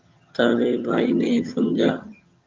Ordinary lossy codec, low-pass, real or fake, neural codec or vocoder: Opus, 24 kbps; 7.2 kHz; fake; vocoder, 22.05 kHz, 80 mel bands, HiFi-GAN